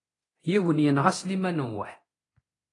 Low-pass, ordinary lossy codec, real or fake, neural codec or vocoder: 10.8 kHz; AAC, 32 kbps; fake; codec, 24 kHz, 0.9 kbps, DualCodec